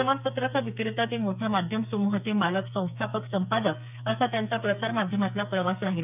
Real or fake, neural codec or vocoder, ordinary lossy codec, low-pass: fake; codec, 44.1 kHz, 2.6 kbps, SNAC; none; 3.6 kHz